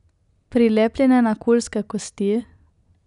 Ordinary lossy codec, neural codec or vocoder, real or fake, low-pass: none; none; real; 10.8 kHz